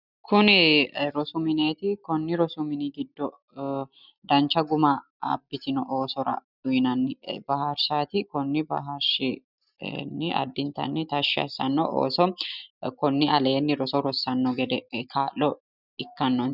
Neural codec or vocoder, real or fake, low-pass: none; real; 5.4 kHz